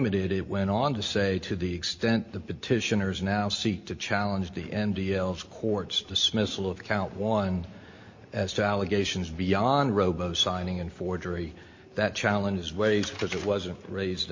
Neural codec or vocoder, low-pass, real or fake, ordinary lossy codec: none; 7.2 kHz; real; MP3, 32 kbps